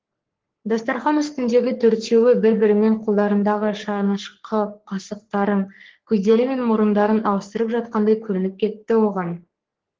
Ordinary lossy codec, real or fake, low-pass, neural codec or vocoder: Opus, 16 kbps; fake; 7.2 kHz; codec, 44.1 kHz, 3.4 kbps, Pupu-Codec